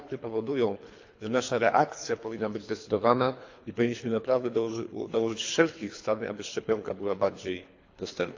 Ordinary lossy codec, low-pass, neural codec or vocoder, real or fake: AAC, 48 kbps; 7.2 kHz; codec, 24 kHz, 3 kbps, HILCodec; fake